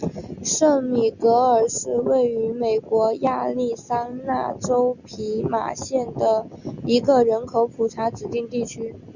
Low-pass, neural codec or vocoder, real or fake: 7.2 kHz; none; real